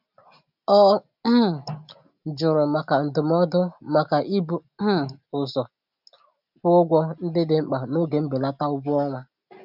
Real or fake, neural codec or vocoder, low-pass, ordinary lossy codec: real; none; 5.4 kHz; none